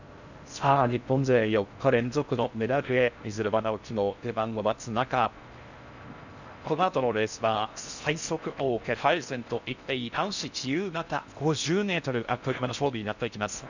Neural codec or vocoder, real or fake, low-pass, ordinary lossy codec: codec, 16 kHz in and 24 kHz out, 0.6 kbps, FocalCodec, streaming, 4096 codes; fake; 7.2 kHz; none